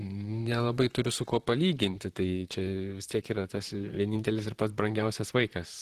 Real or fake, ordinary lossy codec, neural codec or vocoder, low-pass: fake; Opus, 16 kbps; vocoder, 44.1 kHz, 128 mel bands, Pupu-Vocoder; 14.4 kHz